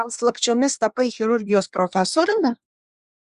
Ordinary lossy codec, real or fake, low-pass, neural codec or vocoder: Opus, 64 kbps; fake; 10.8 kHz; codec, 24 kHz, 1 kbps, SNAC